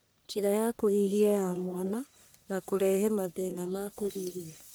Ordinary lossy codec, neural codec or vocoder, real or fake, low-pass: none; codec, 44.1 kHz, 1.7 kbps, Pupu-Codec; fake; none